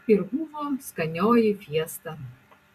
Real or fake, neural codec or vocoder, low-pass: fake; vocoder, 44.1 kHz, 128 mel bands every 256 samples, BigVGAN v2; 14.4 kHz